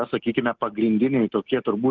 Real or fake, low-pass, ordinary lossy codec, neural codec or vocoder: real; 7.2 kHz; Opus, 32 kbps; none